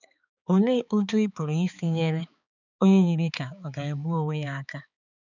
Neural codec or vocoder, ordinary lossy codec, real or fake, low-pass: codec, 16 kHz, 4 kbps, X-Codec, HuBERT features, trained on balanced general audio; none; fake; 7.2 kHz